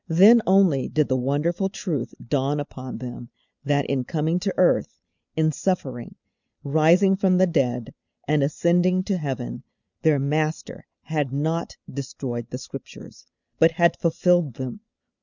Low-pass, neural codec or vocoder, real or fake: 7.2 kHz; none; real